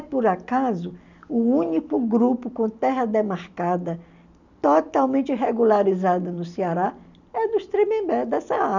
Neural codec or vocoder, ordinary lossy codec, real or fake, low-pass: none; none; real; 7.2 kHz